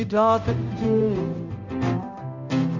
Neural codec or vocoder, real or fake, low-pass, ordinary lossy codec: codec, 16 kHz, 0.5 kbps, X-Codec, HuBERT features, trained on balanced general audio; fake; 7.2 kHz; Opus, 64 kbps